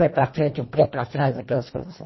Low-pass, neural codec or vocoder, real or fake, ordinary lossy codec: 7.2 kHz; codec, 24 kHz, 1.5 kbps, HILCodec; fake; MP3, 24 kbps